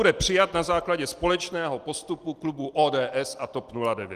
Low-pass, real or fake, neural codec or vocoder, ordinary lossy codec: 14.4 kHz; real; none; Opus, 24 kbps